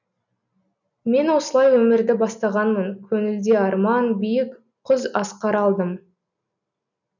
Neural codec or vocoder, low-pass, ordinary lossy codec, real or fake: none; 7.2 kHz; none; real